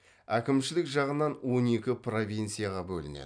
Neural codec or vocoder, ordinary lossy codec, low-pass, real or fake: none; MP3, 96 kbps; 9.9 kHz; real